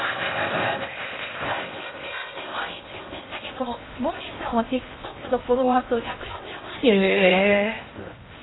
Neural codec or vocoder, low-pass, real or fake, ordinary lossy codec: codec, 16 kHz in and 24 kHz out, 0.6 kbps, FocalCodec, streaming, 4096 codes; 7.2 kHz; fake; AAC, 16 kbps